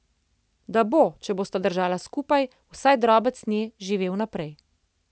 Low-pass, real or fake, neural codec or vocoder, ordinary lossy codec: none; real; none; none